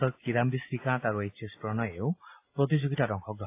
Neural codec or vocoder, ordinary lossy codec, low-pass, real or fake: none; AAC, 24 kbps; 3.6 kHz; real